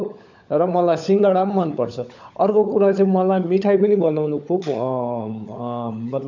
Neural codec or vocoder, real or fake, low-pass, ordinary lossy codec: codec, 16 kHz, 16 kbps, FunCodec, trained on LibriTTS, 50 frames a second; fake; 7.2 kHz; none